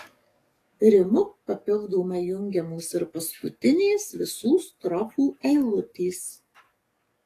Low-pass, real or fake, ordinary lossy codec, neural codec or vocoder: 14.4 kHz; fake; AAC, 48 kbps; codec, 44.1 kHz, 7.8 kbps, DAC